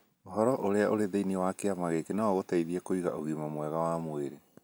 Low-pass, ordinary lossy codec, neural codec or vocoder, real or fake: none; none; none; real